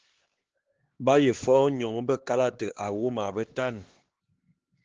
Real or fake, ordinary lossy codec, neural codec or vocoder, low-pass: fake; Opus, 16 kbps; codec, 16 kHz, 2 kbps, X-Codec, HuBERT features, trained on LibriSpeech; 7.2 kHz